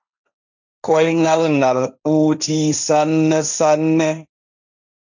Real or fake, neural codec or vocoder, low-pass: fake; codec, 16 kHz, 1.1 kbps, Voila-Tokenizer; 7.2 kHz